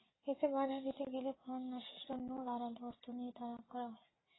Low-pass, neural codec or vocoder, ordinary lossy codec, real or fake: 7.2 kHz; none; AAC, 16 kbps; real